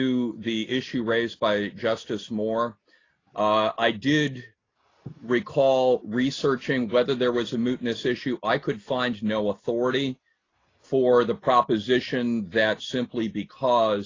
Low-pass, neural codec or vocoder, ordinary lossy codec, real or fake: 7.2 kHz; none; AAC, 32 kbps; real